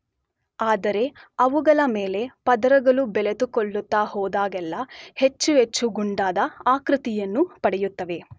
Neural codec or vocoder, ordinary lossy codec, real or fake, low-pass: none; none; real; none